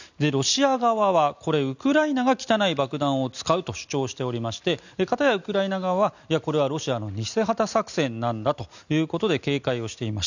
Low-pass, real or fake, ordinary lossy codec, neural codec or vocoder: 7.2 kHz; real; none; none